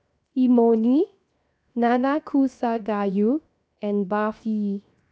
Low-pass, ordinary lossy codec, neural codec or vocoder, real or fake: none; none; codec, 16 kHz, 0.7 kbps, FocalCodec; fake